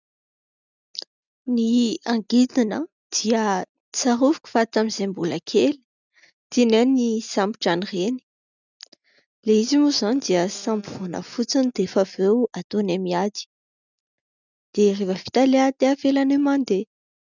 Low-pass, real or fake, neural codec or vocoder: 7.2 kHz; real; none